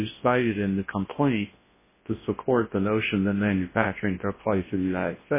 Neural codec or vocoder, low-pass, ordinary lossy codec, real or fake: codec, 24 kHz, 0.9 kbps, WavTokenizer, large speech release; 3.6 kHz; MP3, 16 kbps; fake